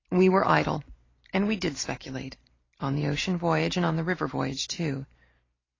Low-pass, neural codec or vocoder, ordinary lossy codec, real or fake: 7.2 kHz; none; AAC, 32 kbps; real